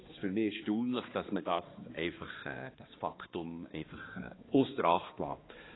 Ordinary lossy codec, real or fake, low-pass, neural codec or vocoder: AAC, 16 kbps; fake; 7.2 kHz; codec, 16 kHz, 2 kbps, X-Codec, HuBERT features, trained on balanced general audio